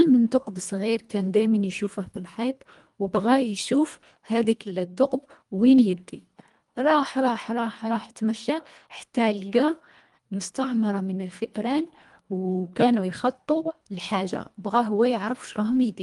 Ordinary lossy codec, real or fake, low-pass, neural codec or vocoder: Opus, 32 kbps; fake; 10.8 kHz; codec, 24 kHz, 1.5 kbps, HILCodec